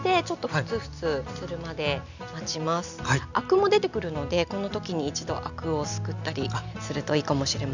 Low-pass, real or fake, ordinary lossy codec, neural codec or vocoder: 7.2 kHz; real; none; none